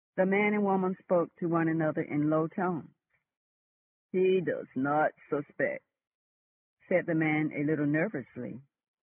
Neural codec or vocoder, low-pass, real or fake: none; 3.6 kHz; real